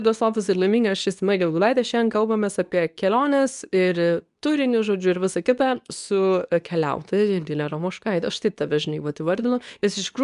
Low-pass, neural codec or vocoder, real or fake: 10.8 kHz; codec, 24 kHz, 0.9 kbps, WavTokenizer, medium speech release version 2; fake